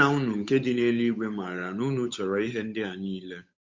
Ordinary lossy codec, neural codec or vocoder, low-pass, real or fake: MP3, 48 kbps; codec, 16 kHz, 8 kbps, FunCodec, trained on Chinese and English, 25 frames a second; 7.2 kHz; fake